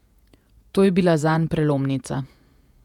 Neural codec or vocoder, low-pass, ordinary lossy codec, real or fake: vocoder, 48 kHz, 128 mel bands, Vocos; 19.8 kHz; none; fake